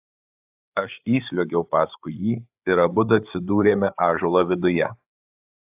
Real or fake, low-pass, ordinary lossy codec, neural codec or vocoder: fake; 3.6 kHz; AAC, 32 kbps; codec, 16 kHz, 8 kbps, FreqCodec, larger model